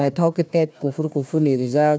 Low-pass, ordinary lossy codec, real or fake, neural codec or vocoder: none; none; fake; codec, 16 kHz, 1 kbps, FunCodec, trained on Chinese and English, 50 frames a second